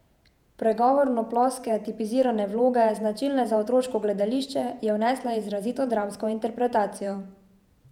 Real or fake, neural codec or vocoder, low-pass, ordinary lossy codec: fake; vocoder, 48 kHz, 128 mel bands, Vocos; 19.8 kHz; none